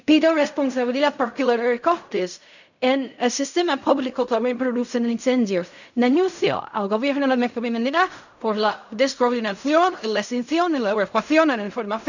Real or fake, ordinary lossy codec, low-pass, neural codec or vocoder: fake; none; 7.2 kHz; codec, 16 kHz in and 24 kHz out, 0.4 kbps, LongCat-Audio-Codec, fine tuned four codebook decoder